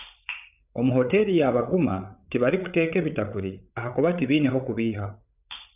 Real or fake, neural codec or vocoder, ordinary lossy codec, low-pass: fake; codec, 16 kHz, 8 kbps, FreqCodec, larger model; none; 3.6 kHz